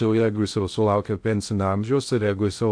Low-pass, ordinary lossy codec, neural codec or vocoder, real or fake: 9.9 kHz; AAC, 64 kbps; codec, 16 kHz in and 24 kHz out, 0.6 kbps, FocalCodec, streaming, 2048 codes; fake